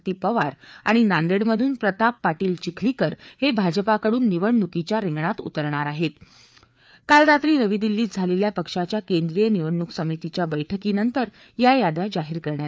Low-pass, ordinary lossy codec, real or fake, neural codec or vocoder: none; none; fake; codec, 16 kHz, 4 kbps, FreqCodec, larger model